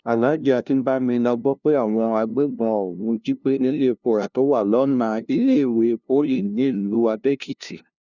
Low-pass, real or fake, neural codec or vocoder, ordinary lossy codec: 7.2 kHz; fake; codec, 16 kHz, 0.5 kbps, FunCodec, trained on LibriTTS, 25 frames a second; none